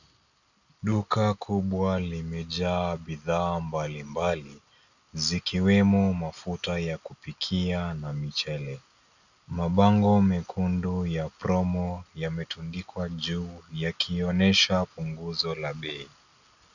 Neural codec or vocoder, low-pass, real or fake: none; 7.2 kHz; real